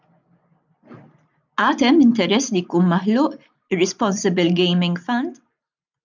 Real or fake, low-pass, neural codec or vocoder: real; 7.2 kHz; none